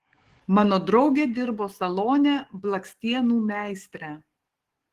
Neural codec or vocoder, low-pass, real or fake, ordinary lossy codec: codec, 44.1 kHz, 7.8 kbps, DAC; 14.4 kHz; fake; Opus, 16 kbps